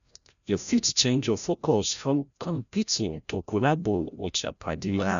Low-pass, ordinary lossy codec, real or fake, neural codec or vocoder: 7.2 kHz; none; fake; codec, 16 kHz, 0.5 kbps, FreqCodec, larger model